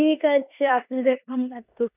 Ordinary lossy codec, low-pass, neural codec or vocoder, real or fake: none; 3.6 kHz; codec, 16 kHz in and 24 kHz out, 0.9 kbps, LongCat-Audio-Codec, four codebook decoder; fake